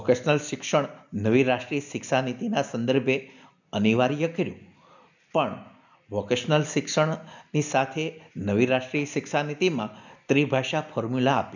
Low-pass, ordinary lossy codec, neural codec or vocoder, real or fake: 7.2 kHz; none; none; real